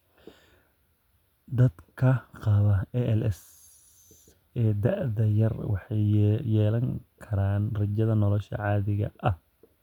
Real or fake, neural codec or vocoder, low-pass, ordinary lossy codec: real; none; 19.8 kHz; none